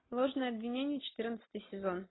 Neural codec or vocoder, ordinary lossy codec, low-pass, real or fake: none; AAC, 16 kbps; 7.2 kHz; real